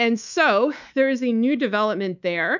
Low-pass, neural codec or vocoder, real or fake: 7.2 kHz; codec, 24 kHz, 3.1 kbps, DualCodec; fake